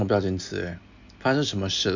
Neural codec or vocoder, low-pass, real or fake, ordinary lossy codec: none; 7.2 kHz; real; none